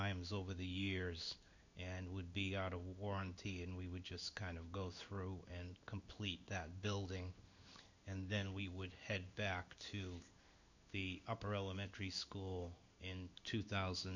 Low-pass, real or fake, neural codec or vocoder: 7.2 kHz; fake; codec, 16 kHz in and 24 kHz out, 1 kbps, XY-Tokenizer